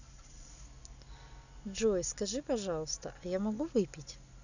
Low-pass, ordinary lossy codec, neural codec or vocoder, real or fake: 7.2 kHz; none; none; real